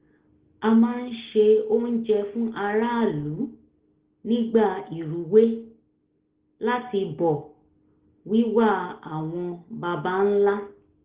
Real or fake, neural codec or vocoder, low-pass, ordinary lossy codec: real; none; 3.6 kHz; Opus, 16 kbps